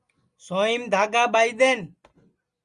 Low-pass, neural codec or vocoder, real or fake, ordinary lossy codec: 10.8 kHz; none; real; Opus, 32 kbps